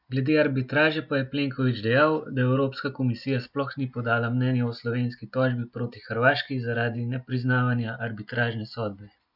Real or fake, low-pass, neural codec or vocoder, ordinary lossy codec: real; 5.4 kHz; none; none